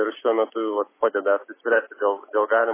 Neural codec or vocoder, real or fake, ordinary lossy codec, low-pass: none; real; MP3, 16 kbps; 3.6 kHz